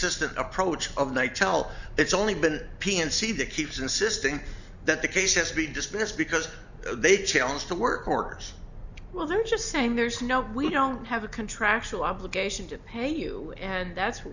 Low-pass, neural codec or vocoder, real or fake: 7.2 kHz; none; real